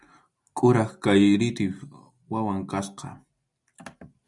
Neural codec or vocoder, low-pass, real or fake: none; 10.8 kHz; real